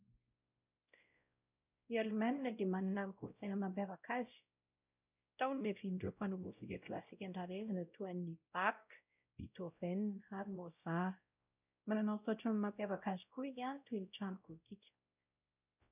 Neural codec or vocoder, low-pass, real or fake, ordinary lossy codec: codec, 16 kHz, 0.5 kbps, X-Codec, WavLM features, trained on Multilingual LibriSpeech; 3.6 kHz; fake; none